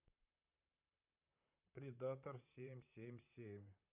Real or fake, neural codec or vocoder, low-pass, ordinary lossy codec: fake; vocoder, 44.1 kHz, 128 mel bands, Pupu-Vocoder; 3.6 kHz; none